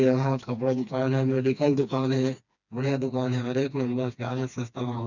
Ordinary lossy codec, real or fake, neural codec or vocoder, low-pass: none; fake; codec, 16 kHz, 2 kbps, FreqCodec, smaller model; 7.2 kHz